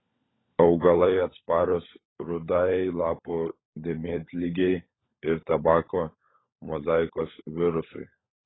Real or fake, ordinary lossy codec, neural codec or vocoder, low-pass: fake; AAC, 16 kbps; codec, 16 kHz, 16 kbps, FunCodec, trained on LibriTTS, 50 frames a second; 7.2 kHz